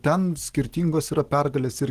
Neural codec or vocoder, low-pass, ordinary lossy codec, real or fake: none; 14.4 kHz; Opus, 16 kbps; real